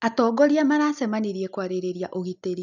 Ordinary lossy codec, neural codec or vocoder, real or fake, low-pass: none; none; real; 7.2 kHz